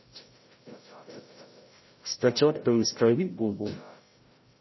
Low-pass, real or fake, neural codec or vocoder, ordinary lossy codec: 7.2 kHz; fake; codec, 16 kHz, 0.5 kbps, FreqCodec, larger model; MP3, 24 kbps